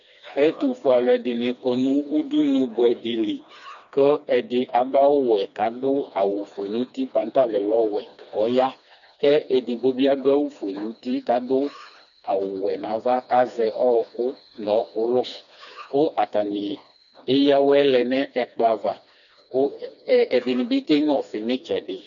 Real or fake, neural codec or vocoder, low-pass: fake; codec, 16 kHz, 2 kbps, FreqCodec, smaller model; 7.2 kHz